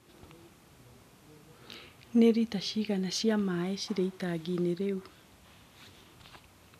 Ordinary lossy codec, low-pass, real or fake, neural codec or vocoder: none; 14.4 kHz; real; none